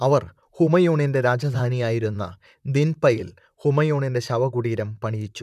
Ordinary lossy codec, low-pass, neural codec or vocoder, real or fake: none; 14.4 kHz; vocoder, 44.1 kHz, 128 mel bands, Pupu-Vocoder; fake